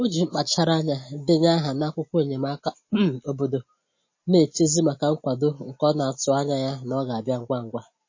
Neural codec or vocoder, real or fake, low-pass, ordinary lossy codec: none; real; 7.2 kHz; MP3, 32 kbps